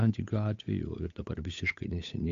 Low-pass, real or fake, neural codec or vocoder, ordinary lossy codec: 7.2 kHz; fake; codec, 16 kHz, 8 kbps, FunCodec, trained on Chinese and English, 25 frames a second; AAC, 48 kbps